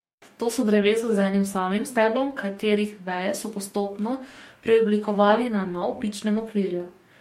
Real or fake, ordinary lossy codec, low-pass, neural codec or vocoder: fake; MP3, 64 kbps; 19.8 kHz; codec, 44.1 kHz, 2.6 kbps, DAC